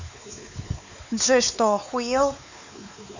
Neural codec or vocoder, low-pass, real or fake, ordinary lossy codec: codec, 16 kHz, 4 kbps, X-Codec, WavLM features, trained on Multilingual LibriSpeech; 7.2 kHz; fake; none